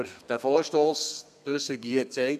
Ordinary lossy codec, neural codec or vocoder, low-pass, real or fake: none; codec, 32 kHz, 1.9 kbps, SNAC; 14.4 kHz; fake